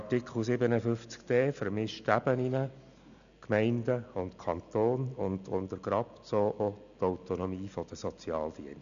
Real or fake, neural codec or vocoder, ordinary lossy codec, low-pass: real; none; none; 7.2 kHz